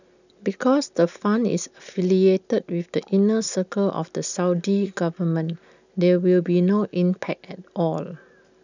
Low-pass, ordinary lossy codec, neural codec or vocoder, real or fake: 7.2 kHz; none; none; real